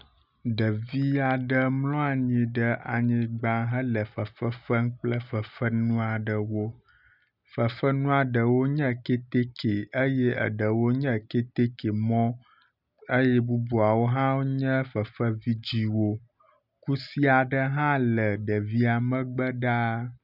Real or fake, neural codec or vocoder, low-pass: real; none; 5.4 kHz